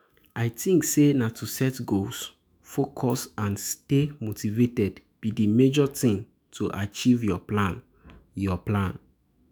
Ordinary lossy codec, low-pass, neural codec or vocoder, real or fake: none; none; autoencoder, 48 kHz, 128 numbers a frame, DAC-VAE, trained on Japanese speech; fake